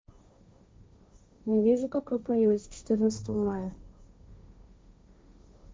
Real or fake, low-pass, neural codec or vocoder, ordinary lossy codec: fake; none; codec, 16 kHz, 1.1 kbps, Voila-Tokenizer; none